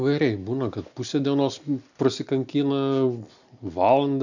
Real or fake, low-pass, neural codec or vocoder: real; 7.2 kHz; none